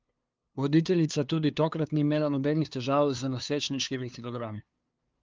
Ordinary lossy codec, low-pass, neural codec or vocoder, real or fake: Opus, 32 kbps; 7.2 kHz; codec, 16 kHz, 2 kbps, FunCodec, trained on LibriTTS, 25 frames a second; fake